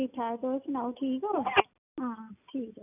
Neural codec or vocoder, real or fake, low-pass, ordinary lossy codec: none; real; 3.6 kHz; none